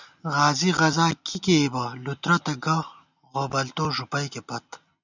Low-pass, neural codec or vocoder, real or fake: 7.2 kHz; none; real